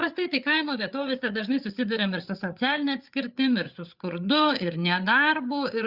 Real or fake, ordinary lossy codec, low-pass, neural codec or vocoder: fake; Opus, 64 kbps; 5.4 kHz; codec, 16 kHz, 8 kbps, FreqCodec, larger model